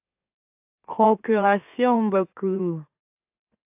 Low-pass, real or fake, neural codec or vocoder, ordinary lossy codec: 3.6 kHz; fake; autoencoder, 44.1 kHz, a latent of 192 numbers a frame, MeloTTS; AAC, 32 kbps